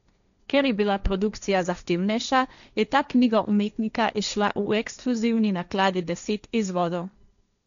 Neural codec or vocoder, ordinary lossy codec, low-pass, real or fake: codec, 16 kHz, 1.1 kbps, Voila-Tokenizer; none; 7.2 kHz; fake